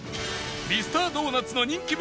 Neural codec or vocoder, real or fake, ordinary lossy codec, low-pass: none; real; none; none